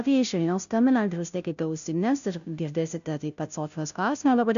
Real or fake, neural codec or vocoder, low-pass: fake; codec, 16 kHz, 0.5 kbps, FunCodec, trained on Chinese and English, 25 frames a second; 7.2 kHz